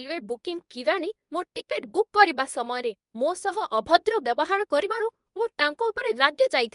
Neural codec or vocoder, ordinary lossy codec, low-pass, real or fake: codec, 24 kHz, 0.9 kbps, WavTokenizer, medium speech release version 2; none; 10.8 kHz; fake